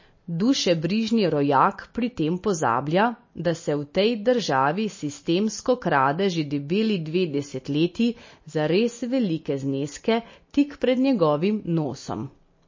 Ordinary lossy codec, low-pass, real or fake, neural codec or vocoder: MP3, 32 kbps; 7.2 kHz; real; none